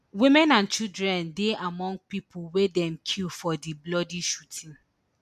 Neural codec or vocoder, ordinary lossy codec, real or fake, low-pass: none; none; real; 14.4 kHz